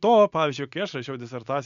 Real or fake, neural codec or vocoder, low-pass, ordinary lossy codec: real; none; 7.2 kHz; MP3, 96 kbps